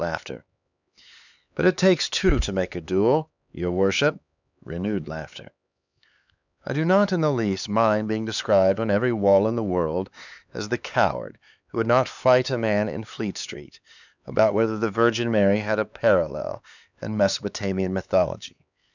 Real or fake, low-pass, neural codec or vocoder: fake; 7.2 kHz; codec, 16 kHz, 4 kbps, X-Codec, HuBERT features, trained on LibriSpeech